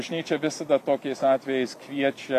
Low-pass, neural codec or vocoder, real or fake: 14.4 kHz; vocoder, 44.1 kHz, 128 mel bands every 256 samples, BigVGAN v2; fake